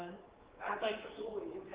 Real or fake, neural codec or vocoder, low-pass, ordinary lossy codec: fake; codec, 24 kHz, 3.1 kbps, DualCodec; 3.6 kHz; Opus, 16 kbps